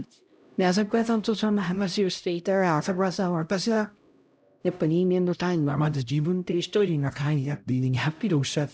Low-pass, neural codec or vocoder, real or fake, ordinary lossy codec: none; codec, 16 kHz, 0.5 kbps, X-Codec, HuBERT features, trained on LibriSpeech; fake; none